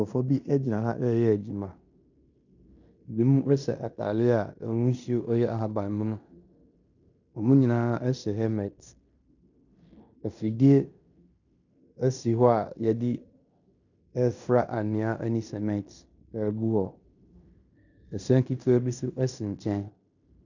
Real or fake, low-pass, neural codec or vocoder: fake; 7.2 kHz; codec, 16 kHz in and 24 kHz out, 0.9 kbps, LongCat-Audio-Codec, fine tuned four codebook decoder